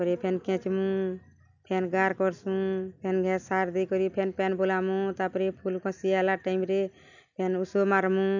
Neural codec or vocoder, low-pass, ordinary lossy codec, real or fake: none; 7.2 kHz; none; real